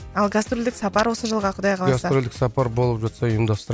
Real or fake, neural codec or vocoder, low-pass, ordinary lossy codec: real; none; none; none